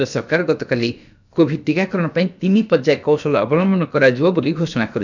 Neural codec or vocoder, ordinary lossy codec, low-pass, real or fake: codec, 16 kHz, about 1 kbps, DyCAST, with the encoder's durations; none; 7.2 kHz; fake